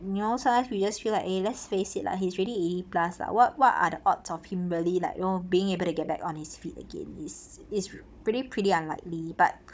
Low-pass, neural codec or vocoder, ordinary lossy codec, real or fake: none; codec, 16 kHz, 16 kbps, FunCodec, trained on Chinese and English, 50 frames a second; none; fake